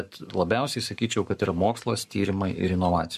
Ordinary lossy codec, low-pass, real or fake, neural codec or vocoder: MP3, 96 kbps; 14.4 kHz; fake; codec, 44.1 kHz, 7.8 kbps, Pupu-Codec